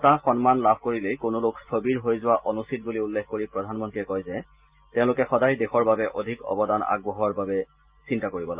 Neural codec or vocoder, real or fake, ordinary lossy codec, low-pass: none; real; Opus, 24 kbps; 3.6 kHz